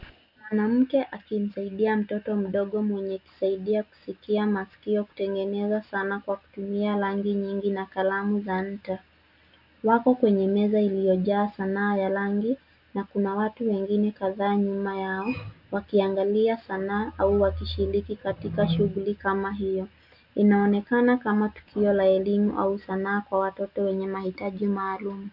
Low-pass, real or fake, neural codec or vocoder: 5.4 kHz; real; none